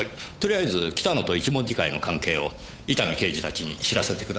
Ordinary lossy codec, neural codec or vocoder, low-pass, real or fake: none; codec, 16 kHz, 8 kbps, FunCodec, trained on Chinese and English, 25 frames a second; none; fake